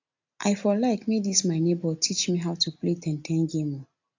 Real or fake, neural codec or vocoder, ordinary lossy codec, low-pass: real; none; AAC, 48 kbps; 7.2 kHz